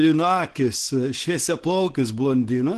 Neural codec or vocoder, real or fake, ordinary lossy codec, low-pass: codec, 24 kHz, 0.9 kbps, WavTokenizer, small release; fake; Opus, 16 kbps; 10.8 kHz